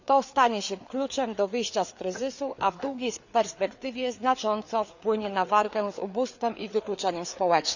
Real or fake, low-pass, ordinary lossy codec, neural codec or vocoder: fake; 7.2 kHz; none; codec, 16 kHz, 4 kbps, FreqCodec, larger model